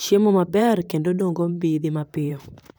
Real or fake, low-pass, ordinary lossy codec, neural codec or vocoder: fake; none; none; vocoder, 44.1 kHz, 128 mel bands, Pupu-Vocoder